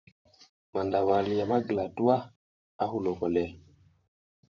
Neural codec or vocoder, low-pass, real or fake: vocoder, 44.1 kHz, 128 mel bands, Pupu-Vocoder; 7.2 kHz; fake